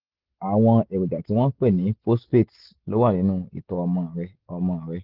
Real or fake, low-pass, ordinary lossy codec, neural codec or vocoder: real; 5.4 kHz; Opus, 16 kbps; none